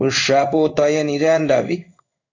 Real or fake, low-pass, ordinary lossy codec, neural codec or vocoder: fake; 7.2 kHz; AAC, 48 kbps; codec, 16 kHz in and 24 kHz out, 1 kbps, XY-Tokenizer